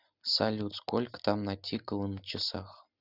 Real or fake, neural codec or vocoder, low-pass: real; none; 5.4 kHz